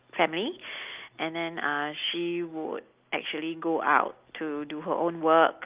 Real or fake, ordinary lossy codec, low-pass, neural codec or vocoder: real; Opus, 32 kbps; 3.6 kHz; none